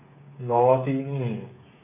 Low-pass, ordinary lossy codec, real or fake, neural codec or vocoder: 3.6 kHz; none; fake; codec, 16 kHz, 8 kbps, FreqCodec, smaller model